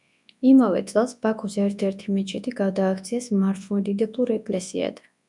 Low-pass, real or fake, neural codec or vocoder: 10.8 kHz; fake; codec, 24 kHz, 0.9 kbps, WavTokenizer, large speech release